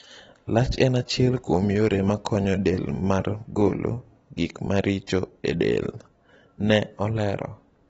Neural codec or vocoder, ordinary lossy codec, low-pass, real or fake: none; AAC, 24 kbps; 19.8 kHz; real